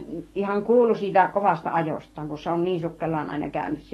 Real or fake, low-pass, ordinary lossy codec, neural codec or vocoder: fake; 19.8 kHz; AAC, 32 kbps; codec, 44.1 kHz, 7.8 kbps, DAC